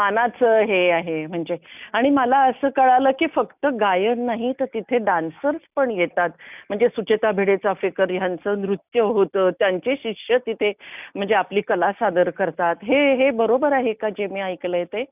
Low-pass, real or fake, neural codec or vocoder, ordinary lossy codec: 3.6 kHz; real; none; none